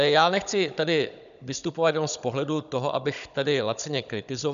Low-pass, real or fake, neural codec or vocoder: 7.2 kHz; fake; codec, 16 kHz, 16 kbps, FunCodec, trained on Chinese and English, 50 frames a second